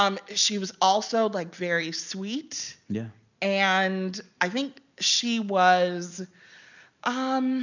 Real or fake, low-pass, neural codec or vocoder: real; 7.2 kHz; none